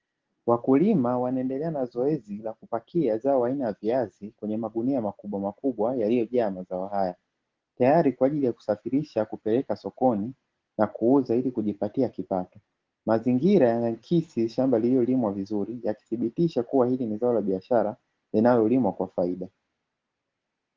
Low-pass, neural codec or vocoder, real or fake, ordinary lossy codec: 7.2 kHz; none; real; Opus, 16 kbps